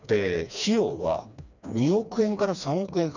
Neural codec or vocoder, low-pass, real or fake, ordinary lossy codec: codec, 16 kHz, 2 kbps, FreqCodec, smaller model; 7.2 kHz; fake; none